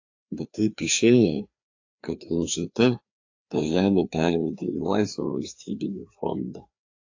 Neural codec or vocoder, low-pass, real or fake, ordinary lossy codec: codec, 16 kHz, 2 kbps, FreqCodec, larger model; 7.2 kHz; fake; AAC, 48 kbps